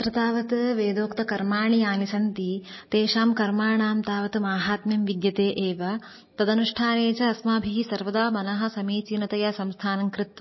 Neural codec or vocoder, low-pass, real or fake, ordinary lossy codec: none; 7.2 kHz; real; MP3, 24 kbps